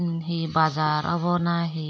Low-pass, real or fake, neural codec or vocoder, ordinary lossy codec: none; real; none; none